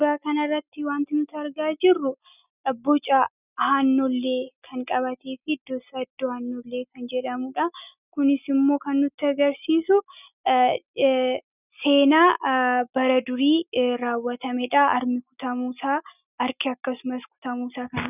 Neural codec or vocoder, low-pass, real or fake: none; 3.6 kHz; real